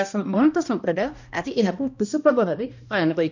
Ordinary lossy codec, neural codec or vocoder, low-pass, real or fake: none; codec, 16 kHz, 1 kbps, X-Codec, HuBERT features, trained on balanced general audio; 7.2 kHz; fake